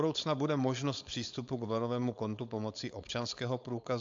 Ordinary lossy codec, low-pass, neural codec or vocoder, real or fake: AAC, 64 kbps; 7.2 kHz; codec, 16 kHz, 4.8 kbps, FACodec; fake